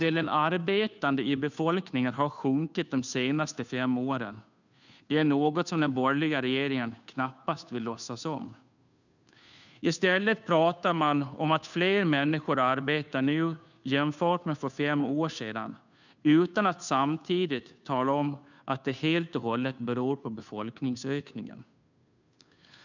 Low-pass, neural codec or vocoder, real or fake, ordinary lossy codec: 7.2 kHz; codec, 16 kHz, 2 kbps, FunCodec, trained on Chinese and English, 25 frames a second; fake; none